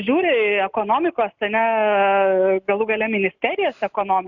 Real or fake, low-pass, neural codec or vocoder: real; 7.2 kHz; none